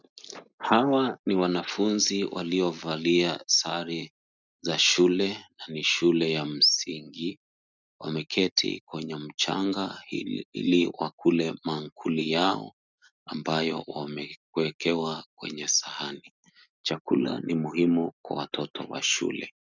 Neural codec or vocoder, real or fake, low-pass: none; real; 7.2 kHz